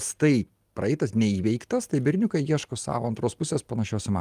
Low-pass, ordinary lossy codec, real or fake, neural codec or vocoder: 14.4 kHz; Opus, 32 kbps; real; none